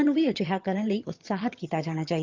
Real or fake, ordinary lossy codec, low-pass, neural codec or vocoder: fake; Opus, 32 kbps; 7.2 kHz; vocoder, 22.05 kHz, 80 mel bands, HiFi-GAN